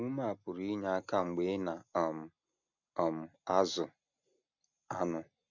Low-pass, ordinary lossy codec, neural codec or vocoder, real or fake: 7.2 kHz; none; none; real